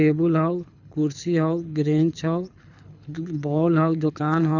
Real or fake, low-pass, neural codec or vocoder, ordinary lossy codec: fake; 7.2 kHz; codec, 24 kHz, 6 kbps, HILCodec; none